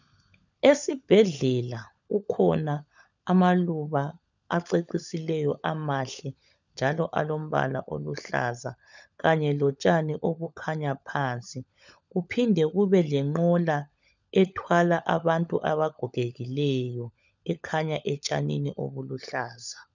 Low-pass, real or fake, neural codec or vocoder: 7.2 kHz; fake; codec, 16 kHz, 16 kbps, FunCodec, trained on LibriTTS, 50 frames a second